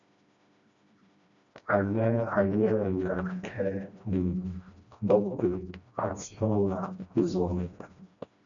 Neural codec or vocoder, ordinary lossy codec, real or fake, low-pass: codec, 16 kHz, 1 kbps, FreqCodec, smaller model; MP3, 96 kbps; fake; 7.2 kHz